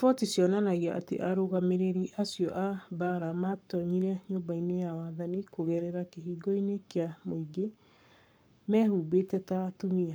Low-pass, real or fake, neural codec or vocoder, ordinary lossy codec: none; fake; codec, 44.1 kHz, 7.8 kbps, Pupu-Codec; none